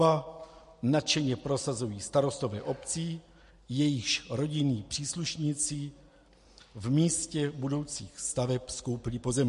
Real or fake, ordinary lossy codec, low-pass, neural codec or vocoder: real; MP3, 48 kbps; 14.4 kHz; none